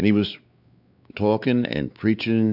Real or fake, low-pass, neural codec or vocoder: fake; 5.4 kHz; codec, 16 kHz, 4 kbps, X-Codec, WavLM features, trained on Multilingual LibriSpeech